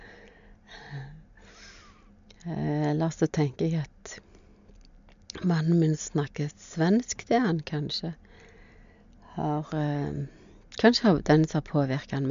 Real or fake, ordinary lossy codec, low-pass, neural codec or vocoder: real; MP3, 64 kbps; 7.2 kHz; none